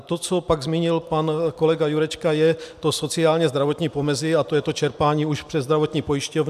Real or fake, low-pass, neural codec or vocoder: real; 14.4 kHz; none